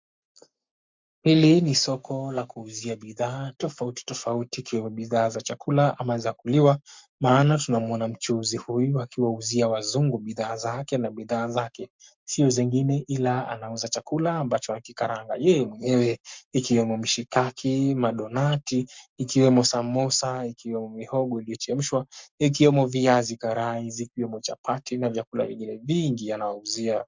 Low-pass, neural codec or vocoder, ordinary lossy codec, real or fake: 7.2 kHz; codec, 44.1 kHz, 7.8 kbps, Pupu-Codec; MP3, 64 kbps; fake